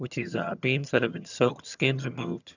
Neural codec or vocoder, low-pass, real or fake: vocoder, 22.05 kHz, 80 mel bands, HiFi-GAN; 7.2 kHz; fake